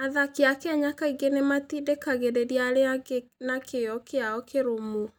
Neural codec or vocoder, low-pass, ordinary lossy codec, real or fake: none; none; none; real